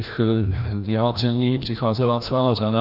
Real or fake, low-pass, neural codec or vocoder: fake; 5.4 kHz; codec, 16 kHz, 1 kbps, FreqCodec, larger model